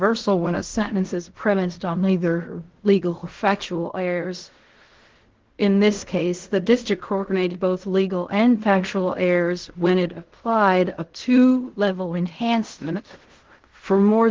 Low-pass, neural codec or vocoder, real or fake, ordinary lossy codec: 7.2 kHz; codec, 16 kHz in and 24 kHz out, 0.4 kbps, LongCat-Audio-Codec, fine tuned four codebook decoder; fake; Opus, 16 kbps